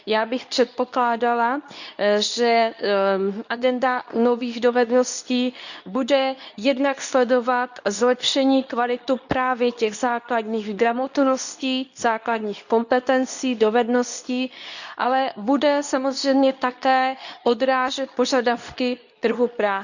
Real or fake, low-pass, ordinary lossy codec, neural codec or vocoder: fake; 7.2 kHz; none; codec, 24 kHz, 0.9 kbps, WavTokenizer, medium speech release version 2